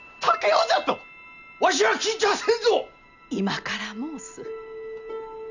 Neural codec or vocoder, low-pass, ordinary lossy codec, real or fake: none; 7.2 kHz; none; real